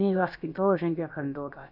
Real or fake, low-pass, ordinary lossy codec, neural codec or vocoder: fake; 5.4 kHz; none; codec, 16 kHz, about 1 kbps, DyCAST, with the encoder's durations